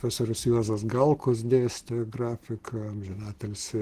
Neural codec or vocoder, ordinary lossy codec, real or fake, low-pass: none; Opus, 16 kbps; real; 14.4 kHz